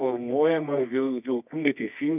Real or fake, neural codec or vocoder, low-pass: fake; codec, 24 kHz, 0.9 kbps, WavTokenizer, medium music audio release; 3.6 kHz